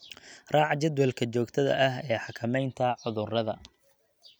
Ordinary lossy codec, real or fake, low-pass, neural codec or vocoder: none; real; none; none